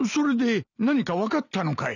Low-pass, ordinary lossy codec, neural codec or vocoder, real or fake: 7.2 kHz; none; none; real